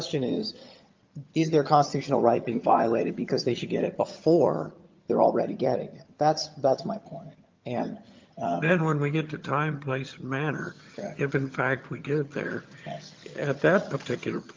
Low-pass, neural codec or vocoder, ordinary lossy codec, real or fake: 7.2 kHz; vocoder, 22.05 kHz, 80 mel bands, HiFi-GAN; Opus, 32 kbps; fake